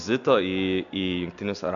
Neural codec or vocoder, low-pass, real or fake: none; 7.2 kHz; real